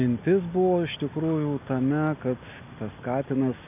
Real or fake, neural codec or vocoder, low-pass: real; none; 3.6 kHz